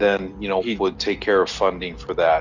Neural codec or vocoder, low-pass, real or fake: none; 7.2 kHz; real